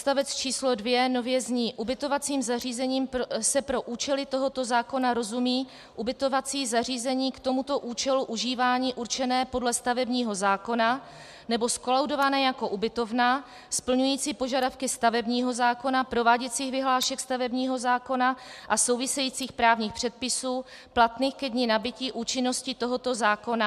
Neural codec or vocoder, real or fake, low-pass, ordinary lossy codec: none; real; 14.4 kHz; MP3, 96 kbps